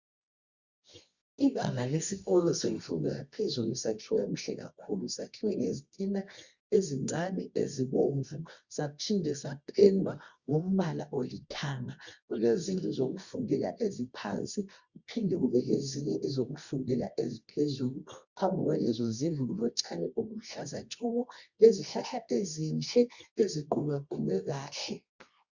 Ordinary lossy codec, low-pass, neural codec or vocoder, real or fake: Opus, 64 kbps; 7.2 kHz; codec, 24 kHz, 0.9 kbps, WavTokenizer, medium music audio release; fake